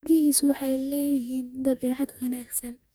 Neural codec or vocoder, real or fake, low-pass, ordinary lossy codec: codec, 44.1 kHz, 2.6 kbps, DAC; fake; none; none